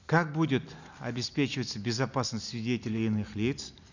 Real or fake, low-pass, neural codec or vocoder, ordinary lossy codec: real; 7.2 kHz; none; none